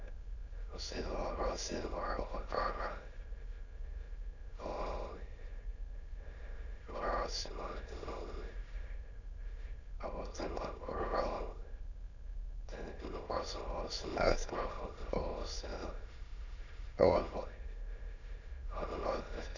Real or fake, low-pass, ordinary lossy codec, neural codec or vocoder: fake; 7.2 kHz; none; autoencoder, 22.05 kHz, a latent of 192 numbers a frame, VITS, trained on many speakers